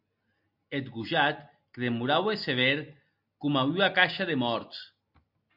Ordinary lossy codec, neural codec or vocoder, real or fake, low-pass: MP3, 48 kbps; none; real; 5.4 kHz